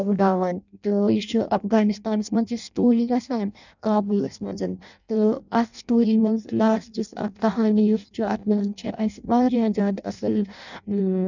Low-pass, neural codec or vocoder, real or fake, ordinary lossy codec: 7.2 kHz; codec, 16 kHz in and 24 kHz out, 0.6 kbps, FireRedTTS-2 codec; fake; none